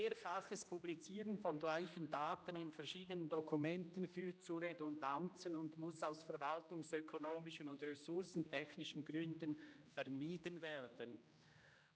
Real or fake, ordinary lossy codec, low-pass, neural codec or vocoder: fake; none; none; codec, 16 kHz, 1 kbps, X-Codec, HuBERT features, trained on general audio